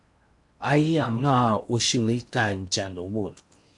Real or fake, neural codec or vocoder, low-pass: fake; codec, 16 kHz in and 24 kHz out, 0.8 kbps, FocalCodec, streaming, 65536 codes; 10.8 kHz